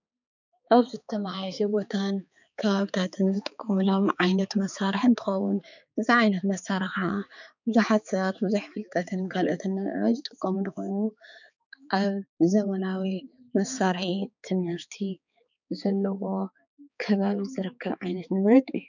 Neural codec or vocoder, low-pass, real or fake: codec, 16 kHz, 4 kbps, X-Codec, HuBERT features, trained on balanced general audio; 7.2 kHz; fake